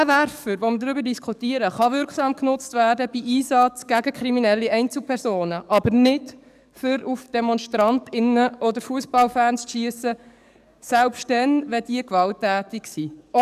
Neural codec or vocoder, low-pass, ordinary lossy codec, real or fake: codec, 44.1 kHz, 7.8 kbps, DAC; 14.4 kHz; none; fake